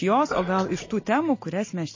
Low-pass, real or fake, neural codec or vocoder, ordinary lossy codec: 7.2 kHz; real; none; MP3, 32 kbps